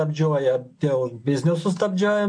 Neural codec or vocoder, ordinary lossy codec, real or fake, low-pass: none; MP3, 48 kbps; real; 9.9 kHz